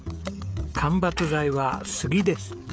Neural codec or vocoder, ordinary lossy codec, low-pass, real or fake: codec, 16 kHz, 16 kbps, FreqCodec, smaller model; none; none; fake